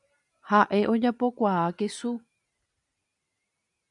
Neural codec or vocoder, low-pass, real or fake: none; 10.8 kHz; real